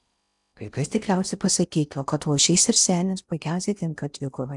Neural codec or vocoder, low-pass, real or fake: codec, 16 kHz in and 24 kHz out, 0.6 kbps, FocalCodec, streaming, 4096 codes; 10.8 kHz; fake